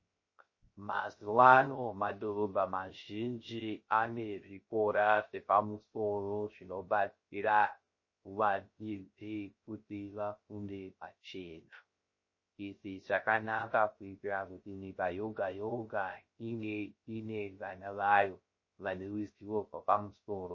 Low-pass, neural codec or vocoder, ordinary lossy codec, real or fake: 7.2 kHz; codec, 16 kHz, 0.3 kbps, FocalCodec; MP3, 32 kbps; fake